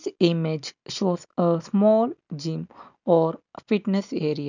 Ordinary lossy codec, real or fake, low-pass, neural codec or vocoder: none; real; 7.2 kHz; none